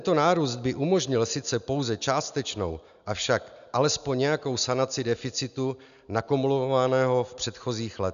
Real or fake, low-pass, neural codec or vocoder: real; 7.2 kHz; none